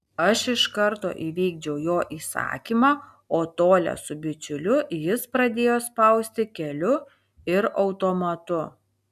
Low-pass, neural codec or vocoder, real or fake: 14.4 kHz; none; real